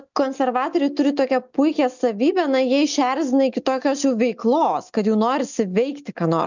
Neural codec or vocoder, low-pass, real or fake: none; 7.2 kHz; real